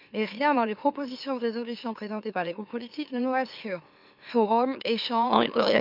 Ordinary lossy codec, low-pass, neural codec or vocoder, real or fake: none; 5.4 kHz; autoencoder, 44.1 kHz, a latent of 192 numbers a frame, MeloTTS; fake